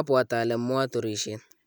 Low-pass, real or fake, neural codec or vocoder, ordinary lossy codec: none; real; none; none